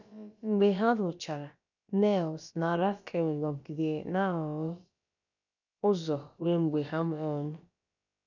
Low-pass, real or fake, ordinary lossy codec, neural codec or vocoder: 7.2 kHz; fake; none; codec, 16 kHz, about 1 kbps, DyCAST, with the encoder's durations